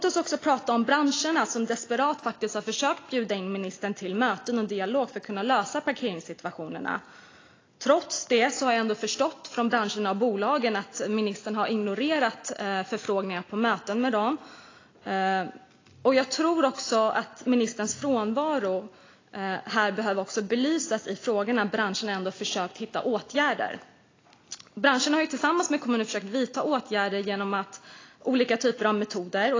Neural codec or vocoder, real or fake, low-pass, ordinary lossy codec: none; real; 7.2 kHz; AAC, 32 kbps